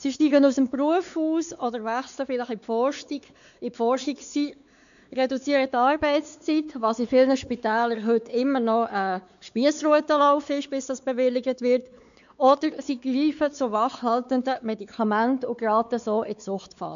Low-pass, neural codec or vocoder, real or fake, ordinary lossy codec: 7.2 kHz; codec, 16 kHz, 4 kbps, X-Codec, WavLM features, trained on Multilingual LibriSpeech; fake; none